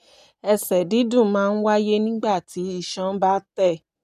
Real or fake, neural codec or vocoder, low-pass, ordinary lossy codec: real; none; 14.4 kHz; none